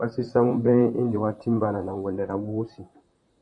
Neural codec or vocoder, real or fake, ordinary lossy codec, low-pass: vocoder, 44.1 kHz, 128 mel bands, Pupu-Vocoder; fake; MP3, 96 kbps; 10.8 kHz